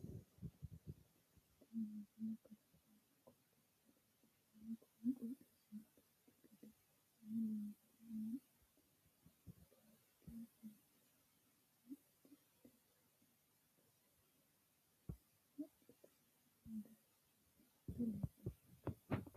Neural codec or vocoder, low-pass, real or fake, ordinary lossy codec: none; 14.4 kHz; real; AAC, 64 kbps